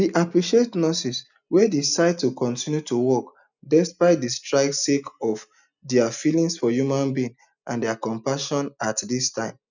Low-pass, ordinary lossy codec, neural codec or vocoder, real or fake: 7.2 kHz; none; none; real